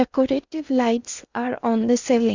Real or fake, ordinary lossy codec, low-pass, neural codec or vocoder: fake; Opus, 64 kbps; 7.2 kHz; codec, 16 kHz in and 24 kHz out, 0.8 kbps, FocalCodec, streaming, 65536 codes